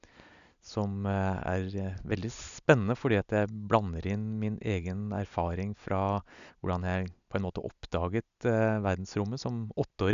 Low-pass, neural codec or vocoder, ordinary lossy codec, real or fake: 7.2 kHz; none; none; real